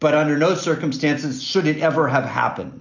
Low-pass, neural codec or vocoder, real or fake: 7.2 kHz; none; real